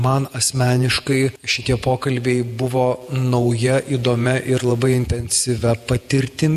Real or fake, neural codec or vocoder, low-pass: fake; vocoder, 48 kHz, 128 mel bands, Vocos; 14.4 kHz